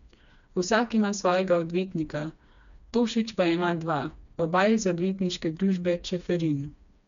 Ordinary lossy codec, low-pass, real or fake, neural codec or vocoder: none; 7.2 kHz; fake; codec, 16 kHz, 2 kbps, FreqCodec, smaller model